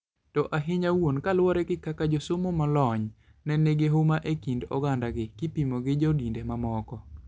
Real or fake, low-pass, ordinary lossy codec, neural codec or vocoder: real; none; none; none